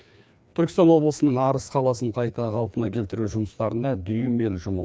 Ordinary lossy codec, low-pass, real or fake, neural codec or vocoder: none; none; fake; codec, 16 kHz, 2 kbps, FreqCodec, larger model